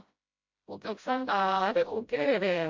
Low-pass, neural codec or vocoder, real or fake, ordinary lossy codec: 7.2 kHz; codec, 16 kHz, 0.5 kbps, FreqCodec, smaller model; fake; MP3, 48 kbps